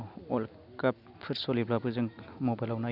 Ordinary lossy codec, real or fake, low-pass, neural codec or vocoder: none; real; 5.4 kHz; none